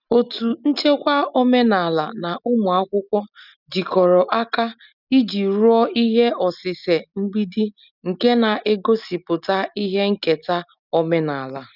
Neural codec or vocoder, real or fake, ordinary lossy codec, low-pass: none; real; none; 5.4 kHz